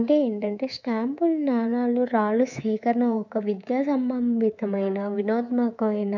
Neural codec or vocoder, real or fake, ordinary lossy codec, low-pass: codec, 24 kHz, 3.1 kbps, DualCodec; fake; none; 7.2 kHz